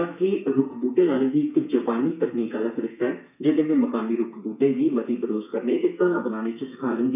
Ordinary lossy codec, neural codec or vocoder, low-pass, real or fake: none; codec, 32 kHz, 1.9 kbps, SNAC; 3.6 kHz; fake